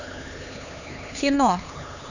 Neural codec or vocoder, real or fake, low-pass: codec, 16 kHz, 4 kbps, X-Codec, HuBERT features, trained on LibriSpeech; fake; 7.2 kHz